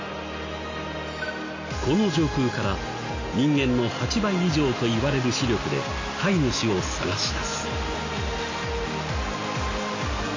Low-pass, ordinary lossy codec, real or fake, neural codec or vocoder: 7.2 kHz; MP3, 32 kbps; real; none